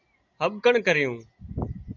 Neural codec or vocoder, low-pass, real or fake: none; 7.2 kHz; real